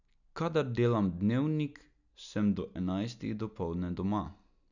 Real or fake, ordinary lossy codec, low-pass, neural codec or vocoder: real; none; 7.2 kHz; none